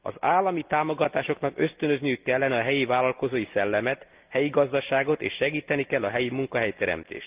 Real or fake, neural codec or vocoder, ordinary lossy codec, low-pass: real; none; Opus, 24 kbps; 3.6 kHz